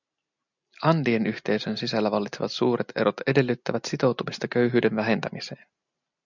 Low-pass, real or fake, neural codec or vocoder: 7.2 kHz; real; none